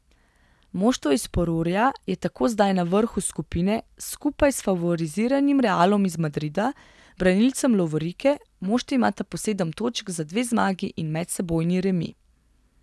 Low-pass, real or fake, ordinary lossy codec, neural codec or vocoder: none; real; none; none